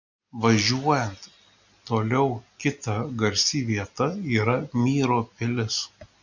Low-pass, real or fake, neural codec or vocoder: 7.2 kHz; real; none